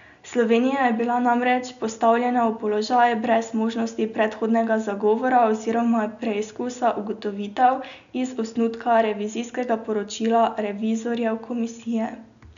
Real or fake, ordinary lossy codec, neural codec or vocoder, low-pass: real; none; none; 7.2 kHz